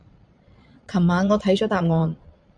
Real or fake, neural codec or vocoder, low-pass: fake; vocoder, 22.05 kHz, 80 mel bands, Vocos; 9.9 kHz